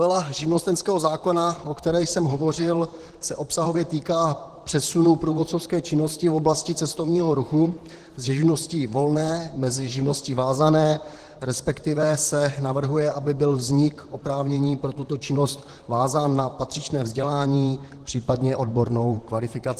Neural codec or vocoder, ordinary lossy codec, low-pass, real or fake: vocoder, 44.1 kHz, 128 mel bands, Pupu-Vocoder; Opus, 16 kbps; 14.4 kHz; fake